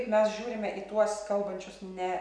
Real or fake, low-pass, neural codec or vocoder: real; 9.9 kHz; none